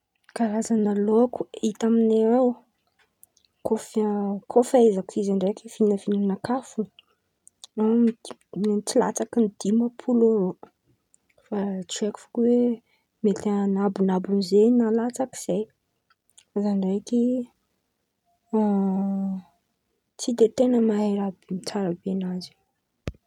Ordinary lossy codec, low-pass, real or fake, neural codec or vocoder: none; 19.8 kHz; real; none